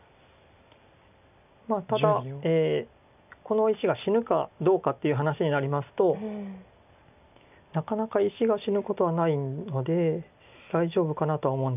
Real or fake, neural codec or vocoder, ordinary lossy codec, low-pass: real; none; none; 3.6 kHz